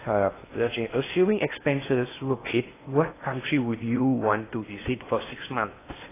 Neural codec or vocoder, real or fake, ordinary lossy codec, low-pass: codec, 16 kHz in and 24 kHz out, 0.8 kbps, FocalCodec, streaming, 65536 codes; fake; AAC, 16 kbps; 3.6 kHz